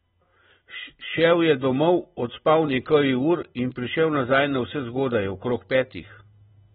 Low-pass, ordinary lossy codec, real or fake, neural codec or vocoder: 10.8 kHz; AAC, 16 kbps; real; none